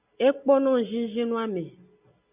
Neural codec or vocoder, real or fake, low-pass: none; real; 3.6 kHz